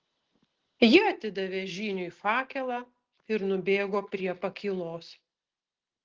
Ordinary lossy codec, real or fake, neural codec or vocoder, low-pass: Opus, 16 kbps; real; none; 7.2 kHz